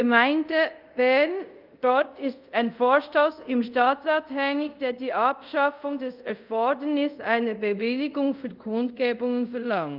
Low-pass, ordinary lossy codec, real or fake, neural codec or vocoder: 5.4 kHz; Opus, 24 kbps; fake; codec, 24 kHz, 0.5 kbps, DualCodec